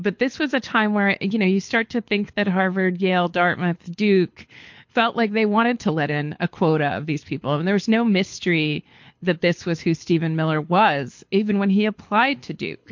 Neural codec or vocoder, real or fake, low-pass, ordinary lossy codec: codec, 24 kHz, 6 kbps, HILCodec; fake; 7.2 kHz; MP3, 48 kbps